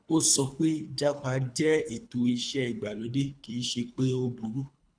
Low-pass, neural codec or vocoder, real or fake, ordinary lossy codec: 9.9 kHz; codec, 24 kHz, 3 kbps, HILCodec; fake; none